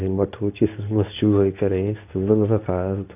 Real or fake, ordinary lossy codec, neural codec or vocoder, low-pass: fake; AAC, 32 kbps; codec, 24 kHz, 0.9 kbps, WavTokenizer, medium speech release version 2; 3.6 kHz